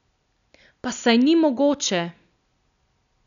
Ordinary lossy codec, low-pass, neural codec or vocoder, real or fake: none; 7.2 kHz; none; real